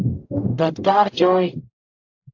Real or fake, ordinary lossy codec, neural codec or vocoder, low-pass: fake; AAC, 48 kbps; codec, 44.1 kHz, 0.9 kbps, DAC; 7.2 kHz